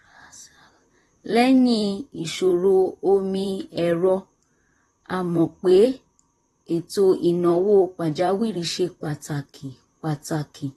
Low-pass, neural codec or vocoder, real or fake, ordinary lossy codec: 19.8 kHz; vocoder, 44.1 kHz, 128 mel bands, Pupu-Vocoder; fake; AAC, 32 kbps